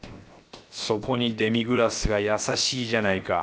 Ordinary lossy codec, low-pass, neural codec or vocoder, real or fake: none; none; codec, 16 kHz, 0.7 kbps, FocalCodec; fake